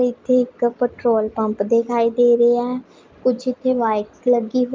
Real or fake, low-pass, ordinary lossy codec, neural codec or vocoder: real; 7.2 kHz; Opus, 32 kbps; none